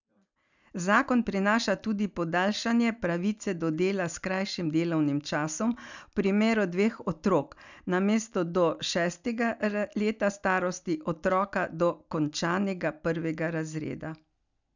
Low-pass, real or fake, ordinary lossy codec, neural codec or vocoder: 7.2 kHz; real; none; none